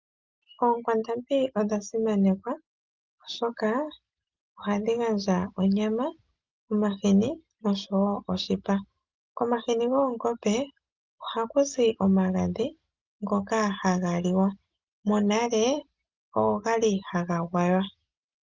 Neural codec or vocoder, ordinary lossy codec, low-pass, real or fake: none; Opus, 24 kbps; 7.2 kHz; real